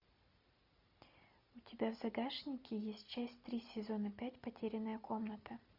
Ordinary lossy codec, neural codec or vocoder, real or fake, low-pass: MP3, 48 kbps; none; real; 5.4 kHz